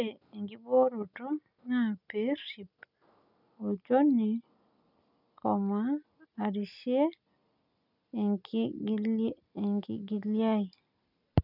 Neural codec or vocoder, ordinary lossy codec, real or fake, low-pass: none; none; real; 5.4 kHz